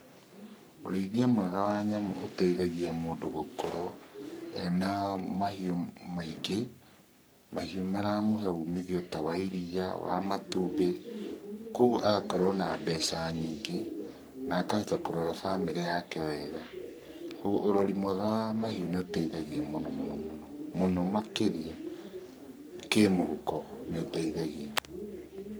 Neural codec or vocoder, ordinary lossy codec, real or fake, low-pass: codec, 44.1 kHz, 3.4 kbps, Pupu-Codec; none; fake; none